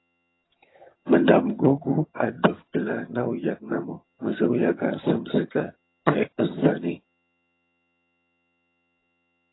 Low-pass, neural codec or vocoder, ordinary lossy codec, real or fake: 7.2 kHz; vocoder, 22.05 kHz, 80 mel bands, HiFi-GAN; AAC, 16 kbps; fake